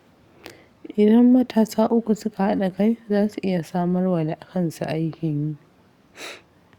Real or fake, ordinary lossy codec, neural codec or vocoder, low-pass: fake; Opus, 64 kbps; codec, 44.1 kHz, 7.8 kbps, DAC; 19.8 kHz